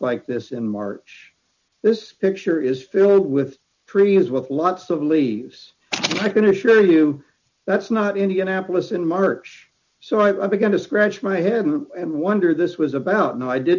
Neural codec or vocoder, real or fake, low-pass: none; real; 7.2 kHz